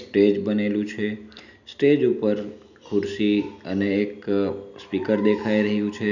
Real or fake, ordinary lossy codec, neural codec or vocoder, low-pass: real; none; none; 7.2 kHz